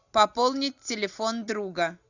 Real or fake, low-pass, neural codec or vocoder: real; 7.2 kHz; none